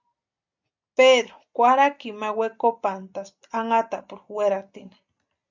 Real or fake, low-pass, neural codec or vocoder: real; 7.2 kHz; none